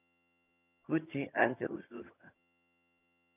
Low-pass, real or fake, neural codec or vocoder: 3.6 kHz; fake; vocoder, 22.05 kHz, 80 mel bands, HiFi-GAN